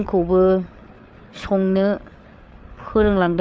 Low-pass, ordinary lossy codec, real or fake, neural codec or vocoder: none; none; fake; codec, 16 kHz, 8 kbps, FreqCodec, larger model